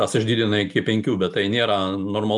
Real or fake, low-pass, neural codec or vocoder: real; 10.8 kHz; none